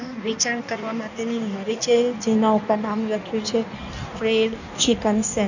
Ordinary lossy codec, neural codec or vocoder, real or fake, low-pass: none; codec, 16 kHz in and 24 kHz out, 1.1 kbps, FireRedTTS-2 codec; fake; 7.2 kHz